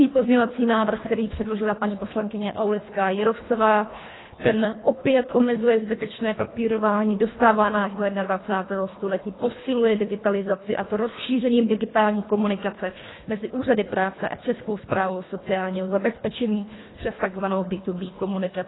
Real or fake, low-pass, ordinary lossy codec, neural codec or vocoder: fake; 7.2 kHz; AAC, 16 kbps; codec, 24 kHz, 1.5 kbps, HILCodec